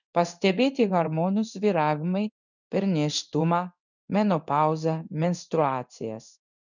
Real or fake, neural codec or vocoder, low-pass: fake; codec, 16 kHz in and 24 kHz out, 1 kbps, XY-Tokenizer; 7.2 kHz